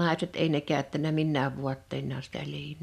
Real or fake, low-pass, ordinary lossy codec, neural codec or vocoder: real; 14.4 kHz; none; none